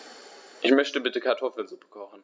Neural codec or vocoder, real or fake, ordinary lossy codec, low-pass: none; real; none; none